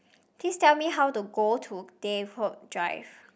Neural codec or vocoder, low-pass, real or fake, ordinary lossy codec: none; none; real; none